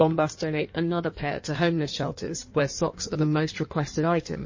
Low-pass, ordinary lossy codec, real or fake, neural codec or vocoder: 7.2 kHz; MP3, 32 kbps; fake; codec, 16 kHz in and 24 kHz out, 1.1 kbps, FireRedTTS-2 codec